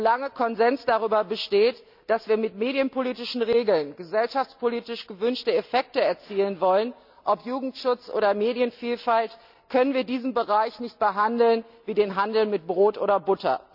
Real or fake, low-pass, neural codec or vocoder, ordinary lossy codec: real; 5.4 kHz; none; none